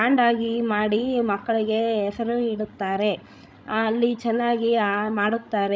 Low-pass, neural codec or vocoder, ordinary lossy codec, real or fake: none; codec, 16 kHz, 16 kbps, FreqCodec, larger model; none; fake